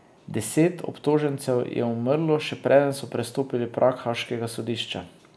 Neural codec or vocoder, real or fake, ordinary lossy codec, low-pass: none; real; none; none